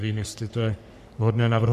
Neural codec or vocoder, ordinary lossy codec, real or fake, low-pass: codec, 44.1 kHz, 3.4 kbps, Pupu-Codec; MP3, 96 kbps; fake; 14.4 kHz